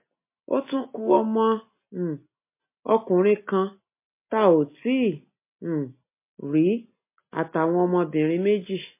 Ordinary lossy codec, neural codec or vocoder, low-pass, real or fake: MP3, 24 kbps; none; 3.6 kHz; real